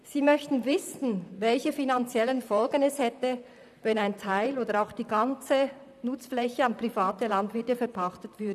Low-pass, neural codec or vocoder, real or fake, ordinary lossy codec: 14.4 kHz; vocoder, 44.1 kHz, 128 mel bands, Pupu-Vocoder; fake; none